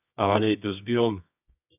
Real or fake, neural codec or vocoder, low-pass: fake; codec, 24 kHz, 0.9 kbps, WavTokenizer, medium music audio release; 3.6 kHz